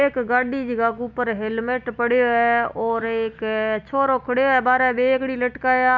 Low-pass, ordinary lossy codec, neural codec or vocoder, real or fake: 7.2 kHz; none; none; real